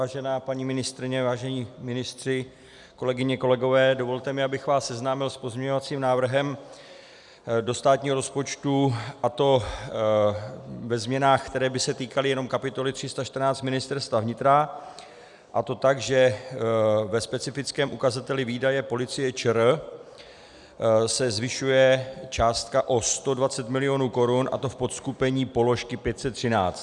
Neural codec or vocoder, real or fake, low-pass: none; real; 10.8 kHz